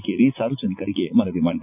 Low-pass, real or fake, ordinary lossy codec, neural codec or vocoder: 3.6 kHz; fake; none; vocoder, 44.1 kHz, 80 mel bands, Vocos